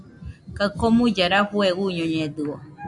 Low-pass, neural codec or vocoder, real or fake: 10.8 kHz; none; real